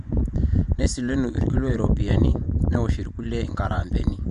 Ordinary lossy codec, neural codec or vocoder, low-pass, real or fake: none; vocoder, 24 kHz, 100 mel bands, Vocos; 10.8 kHz; fake